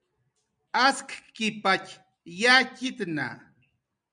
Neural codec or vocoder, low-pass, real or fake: none; 9.9 kHz; real